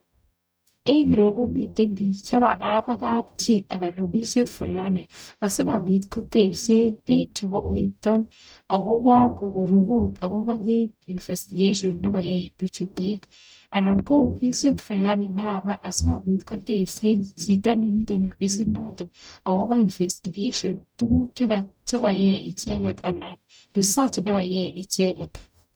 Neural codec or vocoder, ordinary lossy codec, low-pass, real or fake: codec, 44.1 kHz, 0.9 kbps, DAC; none; none; fake